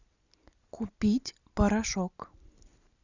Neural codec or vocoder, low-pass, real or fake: none; 7.2 kHz; real